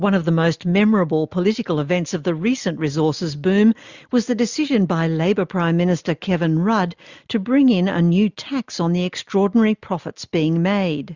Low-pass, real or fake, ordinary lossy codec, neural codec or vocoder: 7.2 kHz; real; Opus, 64 kbps; none